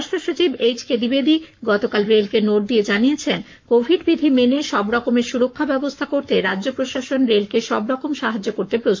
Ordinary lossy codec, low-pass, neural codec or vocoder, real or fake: MP3, 48 kbps; 7.2 kHz; codec, 44.1 kHz, 7.8 kbps, Pupu-Codec; fake